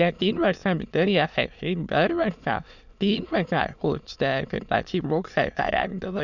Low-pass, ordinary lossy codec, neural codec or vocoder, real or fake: 7.2 kHz; none; autoencoder, 22.05 kHz, a latent of 192 numbers a frame, VITS, trained on many speakers; fake